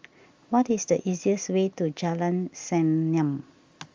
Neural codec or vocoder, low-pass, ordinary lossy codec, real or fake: none; 7.2 kHz; Opus, 32 kbps; real